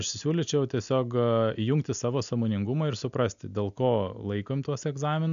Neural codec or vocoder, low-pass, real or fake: none; 7.2 kHz; real